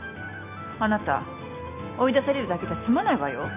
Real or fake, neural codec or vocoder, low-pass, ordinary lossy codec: real; none; 3.6 kHz; none